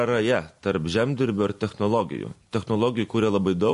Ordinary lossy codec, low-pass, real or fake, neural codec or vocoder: MP3, 48 kbps; 10.8 kHz; real; none